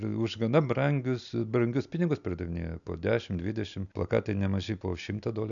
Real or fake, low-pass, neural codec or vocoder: real; 7.2 kHz; none